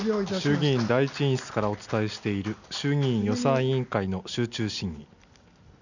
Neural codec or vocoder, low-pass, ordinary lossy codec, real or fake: none; 7.2 kHz; none; real